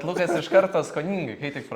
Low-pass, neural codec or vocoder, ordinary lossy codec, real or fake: 19.8 kHz; none; Opus, 64 kbps; real